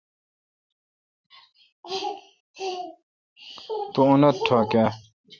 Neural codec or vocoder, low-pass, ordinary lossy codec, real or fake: vocoder, 44.1 kHz, 128 mel bands every 256 samples, BigVGAN v2; 7.2 kHz; Opus, 64 kbps; fake